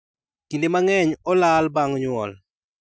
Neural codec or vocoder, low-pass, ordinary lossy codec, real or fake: none; none; none; real